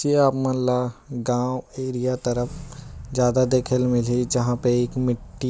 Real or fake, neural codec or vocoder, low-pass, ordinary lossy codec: real; none; none; none